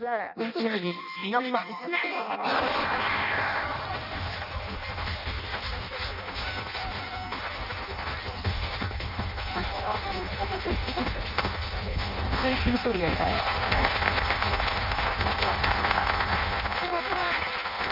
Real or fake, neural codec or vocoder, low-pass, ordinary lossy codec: fake; codec, 16 kHz in and 24 kHz out, 0.6 kbps, FireRedTTS-2 codec; 5.4 kHz; none